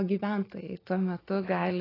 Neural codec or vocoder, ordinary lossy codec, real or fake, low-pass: none; AAC, 24 kbps; real; 5.4 kHz